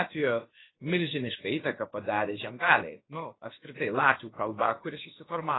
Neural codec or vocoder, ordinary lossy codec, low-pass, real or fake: codec, 16 kHz, about 1 kbps, DyCAST, with the encoder's durations; AAC, 16 kbps; 7.2 kHz; fake